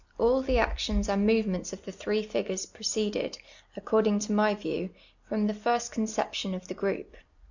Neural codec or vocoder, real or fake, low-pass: none; real; 7.2 kHz